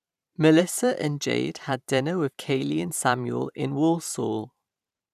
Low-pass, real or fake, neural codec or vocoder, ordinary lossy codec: 14.4 kHz; fake; vocoder, 44.1 kHz, 128 mel bands every 512 samples, BigVGAN v2; none